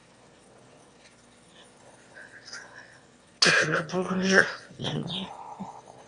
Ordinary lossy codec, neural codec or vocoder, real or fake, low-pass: Opus, 64 kbps; autoencoder, 22.05 kHz, a latent of 192 numbers a frame, VITS, trained on one speaker; fake; 9.9 kHz